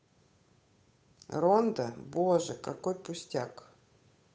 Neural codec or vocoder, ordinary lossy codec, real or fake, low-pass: codec, 16 kHz, 8 kbps, FunCodec, trained on Chinese and English, 25 frames a second; none; fake; none